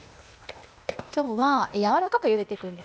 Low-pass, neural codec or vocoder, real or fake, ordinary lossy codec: none; codec, 16 kHz, 0.8 kbps, ZipCodec; fake; none